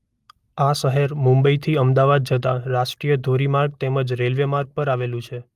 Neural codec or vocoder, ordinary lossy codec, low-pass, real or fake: none; Opus, 32 kbps; 14.4 kHz; real